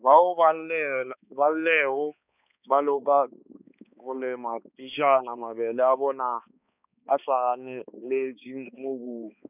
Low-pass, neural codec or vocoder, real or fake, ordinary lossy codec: 3.6 kHz; codec, 16 kHz, 2 kbps, X-Codec, HuBERT features, trained on balanced general audio; fake; none